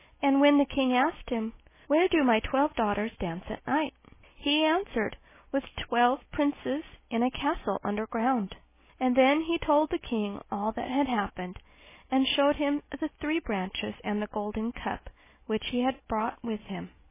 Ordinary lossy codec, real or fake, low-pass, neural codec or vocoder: MP3, 16 kbps; real; 3.6 kHz; none